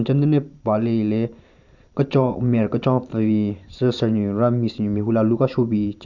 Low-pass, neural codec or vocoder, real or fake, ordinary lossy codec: 7.2 kHz; vocoder, 44.1 kHz, 128 mel bands every 512 samples, BigVGAN v2; fake; none